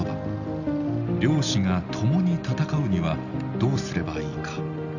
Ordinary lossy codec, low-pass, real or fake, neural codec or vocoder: none; 7.2 kHz; real; none